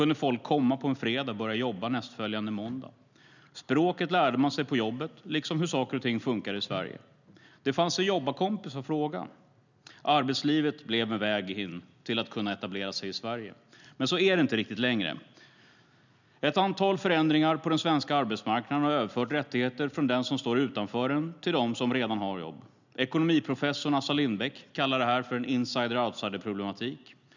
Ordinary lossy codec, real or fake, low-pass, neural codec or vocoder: none; real; 7.2 kHz; none